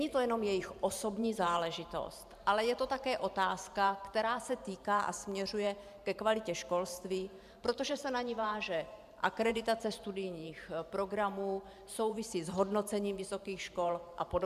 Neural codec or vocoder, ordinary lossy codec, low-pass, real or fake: vocoder, 44.1 kHz, 128 mel bands every 512 samples, BigVGAN v2; MP3, 96 kbps; 14.4 kHz; fake